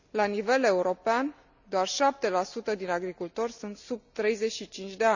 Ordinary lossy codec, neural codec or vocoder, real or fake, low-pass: none; none; real; 7.2 kHz